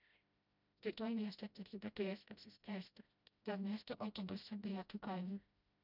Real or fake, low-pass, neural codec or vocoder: fake; 5.4 kHz; codec, 16 kHz, 0.5 kbps, FreqCodec, smaller model